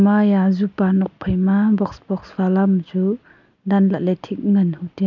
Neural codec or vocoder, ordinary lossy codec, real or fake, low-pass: none; none; real; 7.2 kHz